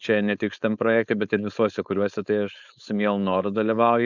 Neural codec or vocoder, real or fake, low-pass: codec, 16 kHz, 4.8 kbps, FACodec; fake; 7.2 kHz